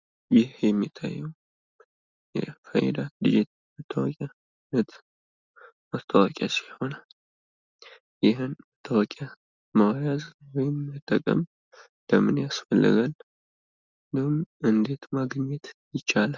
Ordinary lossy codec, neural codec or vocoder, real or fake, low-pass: Opus, 64 kbps; none; real; 7.2 kHz